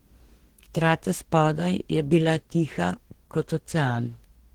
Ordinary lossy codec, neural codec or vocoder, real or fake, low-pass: Opus, 16 kbps; codec, 44.1 kHz, 2.6 kbps, DAC; fake; 19.8 kHz